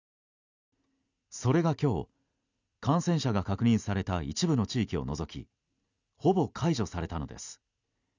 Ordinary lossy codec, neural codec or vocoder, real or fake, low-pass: none; none; real; 7.2 kHz